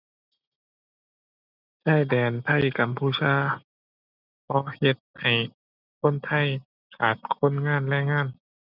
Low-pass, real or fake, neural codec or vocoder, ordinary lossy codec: 5.4 kHz; real; none; none